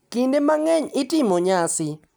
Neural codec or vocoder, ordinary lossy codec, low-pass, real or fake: none; none; none; real